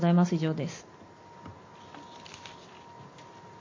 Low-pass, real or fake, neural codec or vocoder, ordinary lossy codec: 7.2 kHz; real; none; MP3, 32 kbps